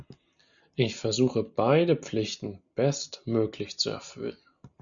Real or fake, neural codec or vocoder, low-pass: real; none; 7.2 kHz